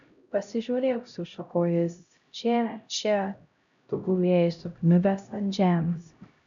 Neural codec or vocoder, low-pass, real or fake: codec, 16 kHz, 0.5 kbps, X-Codec, HuBERT features, trained on LibriSpeech; 7.2 kHz; fake